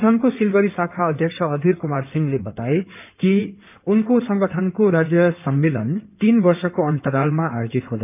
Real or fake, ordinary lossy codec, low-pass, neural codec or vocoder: fake; none; 3.6 kHz; codec, 16 kHz in and 24 kHz out, 2.2 kbps, FireRedTTS-2 codec